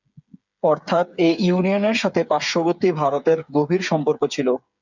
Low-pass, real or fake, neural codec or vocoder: 7.2 kHz; fake; codec, 16 kHz, 8 kbps, FreqCodec, smaller model